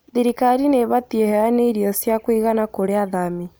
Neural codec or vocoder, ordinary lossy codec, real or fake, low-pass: none; none; real; none